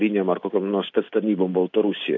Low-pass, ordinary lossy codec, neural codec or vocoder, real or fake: 7.2 kHz; AAC, 48 kbps; none; real